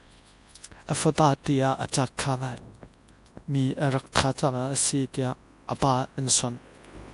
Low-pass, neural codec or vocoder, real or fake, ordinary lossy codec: 10.8 kHz; codec, 24 kHz, 0.9 kbps, WavTokenizer, large speech release; fake; AAC, 64 kbps